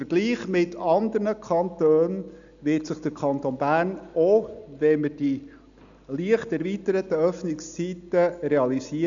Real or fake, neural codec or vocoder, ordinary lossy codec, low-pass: real; none; none; 7.2 kHz